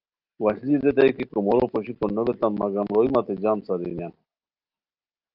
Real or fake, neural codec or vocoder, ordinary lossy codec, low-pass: real; none; Opus, 32 kbps; 5.4 kHz